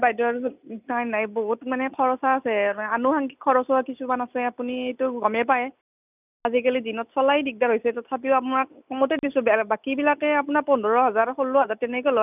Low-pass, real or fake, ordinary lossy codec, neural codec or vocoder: 3.6 kHz; real; none; none